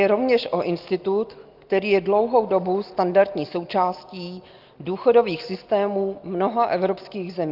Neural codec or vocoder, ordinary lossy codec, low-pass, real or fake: none; Opus, 32 kbps; 5.4 kHz; real